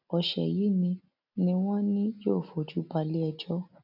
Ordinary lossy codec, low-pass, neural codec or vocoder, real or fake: none; 5.4 kHz; none; real